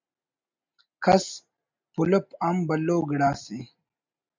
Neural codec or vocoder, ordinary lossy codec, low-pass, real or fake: none; MP3, 64 kbps; 7.2 kHz; real